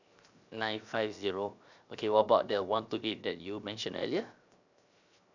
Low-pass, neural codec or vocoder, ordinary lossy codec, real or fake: 7.2 kHz; codec, 16 kHz, 0.7 kbps, FocalCodec; none; fake